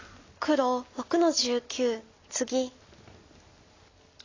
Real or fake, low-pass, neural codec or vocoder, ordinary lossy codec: real; 7.2 kHz; none; AAC, 32 kbps